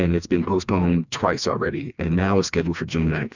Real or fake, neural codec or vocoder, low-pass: fake; codec, 16 kHz, 2 kbps, FreqCodec, smaller model; 7.2 kHz